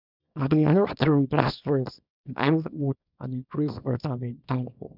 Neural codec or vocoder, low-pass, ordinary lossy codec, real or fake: codec, 24 kHz, 0.9 kbps, WavTokenizer, small release; 5.4 kHz; none; fake